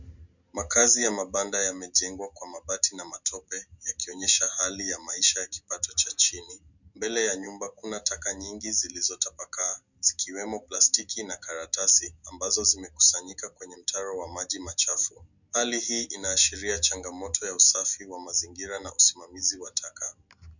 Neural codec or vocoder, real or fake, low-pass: none; real; 7.2 kHz